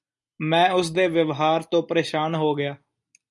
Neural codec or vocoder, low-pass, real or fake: none; 10.8 kHz; real